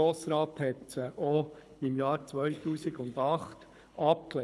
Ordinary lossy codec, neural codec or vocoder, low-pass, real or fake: none; codec, 24 kHz, 6 kbps, HILCodec; none; fake